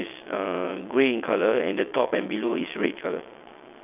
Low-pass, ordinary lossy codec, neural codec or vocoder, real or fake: 3.6 kHz; none; vocoder, 22.05 kHz, 80 mel bands, WaveNeXt; fake